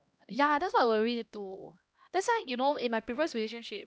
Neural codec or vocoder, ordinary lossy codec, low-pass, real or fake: codec, 16 kHz, 1 kbps, X-Codec, HuBERT features, trained on LibriSpeech; none; none; fake